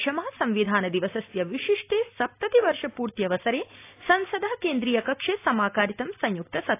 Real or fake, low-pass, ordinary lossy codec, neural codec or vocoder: real; 3.6 kHz; AAC, 24 kbps; none